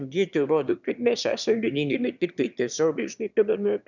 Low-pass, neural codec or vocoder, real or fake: 7.2 kHz; autoencoder, 22.05 kHz, a latent of 192 numbers a frame, VITS, trained on one speaker; fake